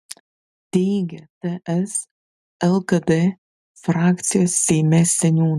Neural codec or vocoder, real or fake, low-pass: none; real; 14.4 kHz